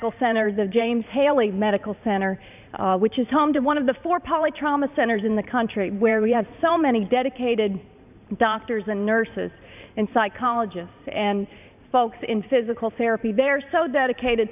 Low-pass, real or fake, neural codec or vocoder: 3.6 kHz; fake; vocoder, 44.1 kHz, 128 mel bands every 512 samples, BigVGAN v2